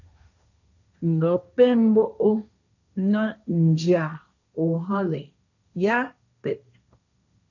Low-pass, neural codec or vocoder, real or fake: 7.2 kHz; codec, 16 kHz, 1.1 kbps, Voila-Tokenizer; fake